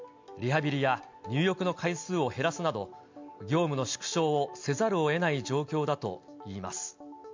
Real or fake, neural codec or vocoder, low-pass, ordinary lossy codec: real; none; 7.2 kHz; MP3, 64 kbps